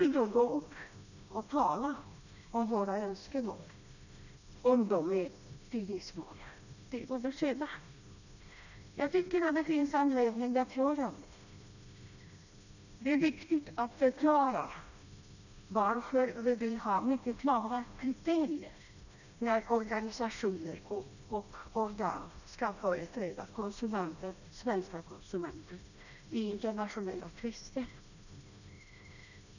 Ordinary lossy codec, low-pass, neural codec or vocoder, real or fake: none; 7.2 kHz; codec, 16 kHz, 1 kbps, FreqCodec, smaller model; fake